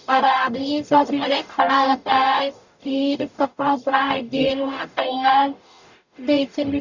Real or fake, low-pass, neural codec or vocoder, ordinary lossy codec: fake; 7.2 kHz; codec, 44.1 kHz, 0.9 kbps, DAC; none